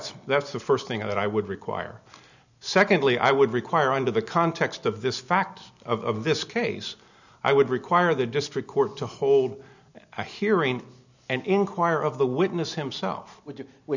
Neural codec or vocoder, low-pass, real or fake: none; 7.2 kHz; real